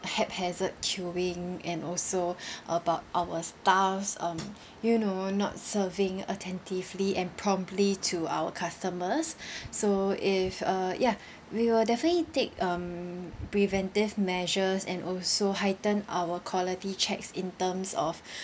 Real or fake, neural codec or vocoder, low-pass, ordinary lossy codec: real; none; none; none